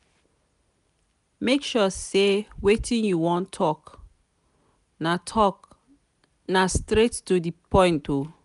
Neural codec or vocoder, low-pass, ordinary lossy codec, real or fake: none; 10.8 kHz; none; real